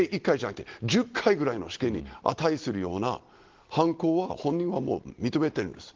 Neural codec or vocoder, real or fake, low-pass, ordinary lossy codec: none; real; 7.2 kHz; Opus, 16 kbps